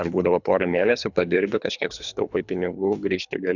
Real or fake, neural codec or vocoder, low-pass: fake; codec, 24 kHz, 3 kbps, HILCodec; 7.2 kHz